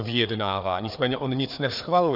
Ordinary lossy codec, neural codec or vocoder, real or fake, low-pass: AAC, 48 kbps; codec, 16 kHz, 4 kbps, FreqCodec, larger model; fake; 5.4 kHz